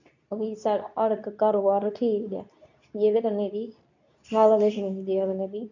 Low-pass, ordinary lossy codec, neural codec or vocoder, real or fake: 7.2 kHz; none; codec, 24 kHz, 0.9 kbps, WavTokenizer, medium speech release version 2; fake